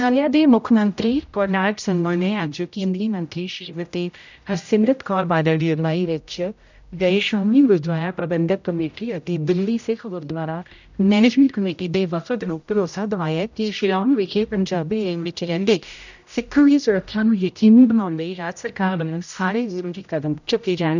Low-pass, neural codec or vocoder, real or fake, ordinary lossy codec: 7.2 kHz; codec, 16 kHz, 0.5 kbps, X-Codec, HuBERT features, trained on general audio; fake; none